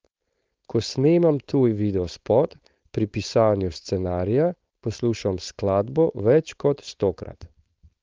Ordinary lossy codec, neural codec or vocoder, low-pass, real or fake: Opus, 24 kbps; codec, 16 kHz, 4.8 kbps, FACodec; 7.2 kHz; fake